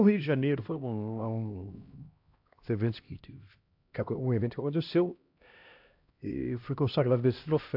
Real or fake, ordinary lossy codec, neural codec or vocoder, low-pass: fake; AAC, 48 kbps; codec, 16 kHz, 1 kbps, X-Codec, HuBERT features, trained on LibriSpeech; 5.4 kHz